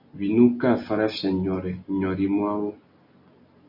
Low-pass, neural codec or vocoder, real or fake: 5.4 kHz; none; real